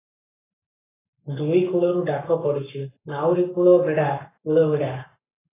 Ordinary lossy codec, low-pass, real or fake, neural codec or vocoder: AAC, 16 kbps; 3.6 kHz; fake; codec, 44.1 kHz, 7.8 kbps, Pupu-Codec